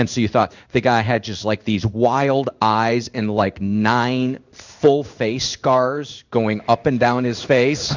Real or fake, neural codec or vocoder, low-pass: fake; codec, 16 kHz in and 24 kHz out, 1 kbps, XY-Tokenizer; 7.2 kHz